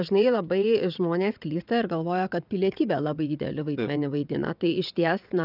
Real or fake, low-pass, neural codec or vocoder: fake; 5.4 kHz; vocoder, 22.05 kHz, 80 mel bands, WaveNeXt